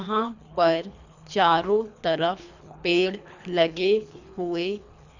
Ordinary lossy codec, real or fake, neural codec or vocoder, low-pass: none; fake; codec, 24 kHz, 3 kbps, HILCodec; 7.2 kHz